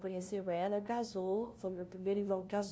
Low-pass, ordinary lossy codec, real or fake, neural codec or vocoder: none; none; fake; codec, 16 kHz, 0.5 kbps, FunCodec, trained on LibriTTS, 25 frames a second